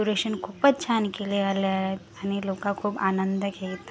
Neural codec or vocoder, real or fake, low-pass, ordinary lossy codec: none; real; none; none